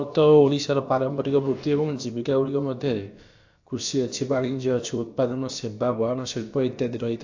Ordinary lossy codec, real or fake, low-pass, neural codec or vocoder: AAC, 48 kbps; fake; 7.2 kHz; codec, 16 kHz, about 1 kbps, DyCAST, with the encoder's durations